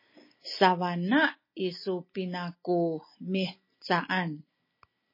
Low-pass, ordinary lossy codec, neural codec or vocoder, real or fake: 5.4 kHz; MP3, 24 kbps; none; real